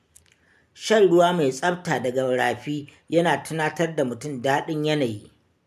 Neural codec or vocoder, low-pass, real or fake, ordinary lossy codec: none; 14.4 kHz; real; MP3, 96 kbps